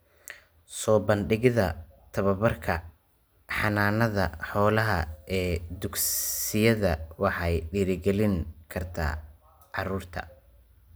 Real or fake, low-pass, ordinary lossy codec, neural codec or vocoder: real; none; none; none